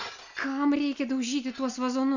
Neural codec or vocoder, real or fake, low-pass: none; real; 7.2 kHz